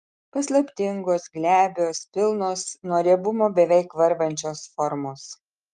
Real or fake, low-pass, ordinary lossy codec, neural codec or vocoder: real; 10.8 kHz; Opus, 32 kbps; none